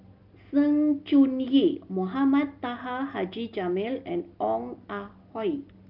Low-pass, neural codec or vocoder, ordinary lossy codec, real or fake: 5.4 kHz; none; Opus, 24 kbps; real